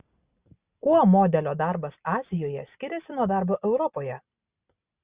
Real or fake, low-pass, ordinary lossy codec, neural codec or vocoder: real; 3.6 kHz; Opus, 64 kbps; none